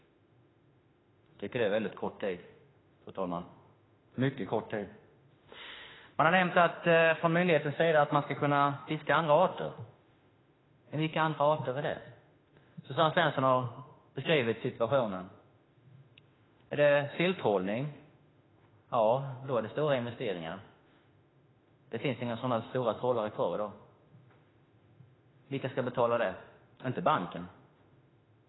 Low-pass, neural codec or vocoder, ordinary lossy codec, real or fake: 7.2 kHz; autoencoder, 48 kHz, 32 numbers a frame, DAC-VAE, trained on Japanese speech; AAC, 16 kbps; fake